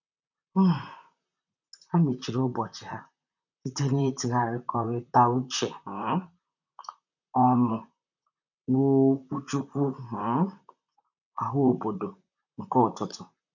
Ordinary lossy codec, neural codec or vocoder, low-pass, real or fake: none; vocoder, 44.1 kHz, 128 mel bands, Pupu-Vocoder; 7.2 kHz; fake